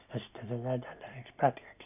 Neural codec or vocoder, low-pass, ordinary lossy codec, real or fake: none; 3.6 kHz; none; real